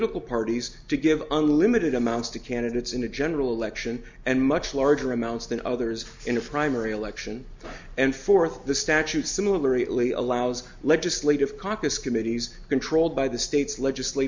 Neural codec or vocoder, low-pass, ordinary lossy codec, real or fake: none; 7.2 kHz; MP3, 64 kbps; real